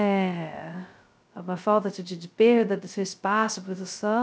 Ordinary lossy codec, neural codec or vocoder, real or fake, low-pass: none; codec, 16 kHz, 0.2 kbps, FocalCodec; fake; none